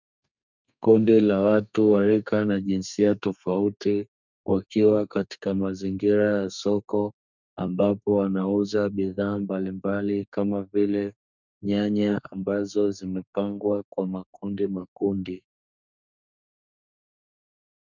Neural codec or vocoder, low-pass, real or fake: codec, 32 kHz, 1.9 kbps, SNAC; 7.2 kHz; fake